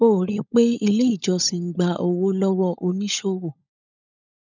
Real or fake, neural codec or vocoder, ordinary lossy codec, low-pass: fake; codec, 16 kHz, 16 kbps, FunCodec, trained on LibriTTS, 50 frames a second; none; 7.2 kHz